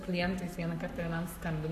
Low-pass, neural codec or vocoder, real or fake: 14.4 kHz; codec, 44.1 kHz, 7.8 kbps, Pupu-Codec; fake